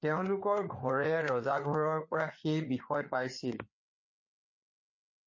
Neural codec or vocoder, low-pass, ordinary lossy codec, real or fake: codec, 16 kHz, 4 kbps, FreqCodec, larger model; 7.2 kHz; MP3, 32 kbps; fake